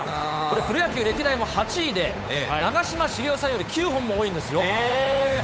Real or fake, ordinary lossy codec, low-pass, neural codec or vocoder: fake; none; none; codec, 16 kHz, 8 kbps, FunCodec, trained on Chinese and English, 25 frames a second